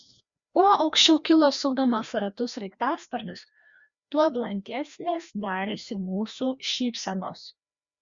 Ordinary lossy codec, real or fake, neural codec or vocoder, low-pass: Opus, 64 kbps; fake; codec, 16 kHz, 1 kbps, FreqCodec, larger model; 7.2 kHz